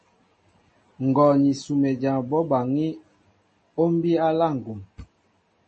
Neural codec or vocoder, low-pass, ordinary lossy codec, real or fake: none; 10.8 kHz; MP3, 32 kbps; real